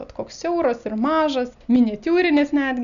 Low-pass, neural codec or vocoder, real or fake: 7.2 kHz; none; real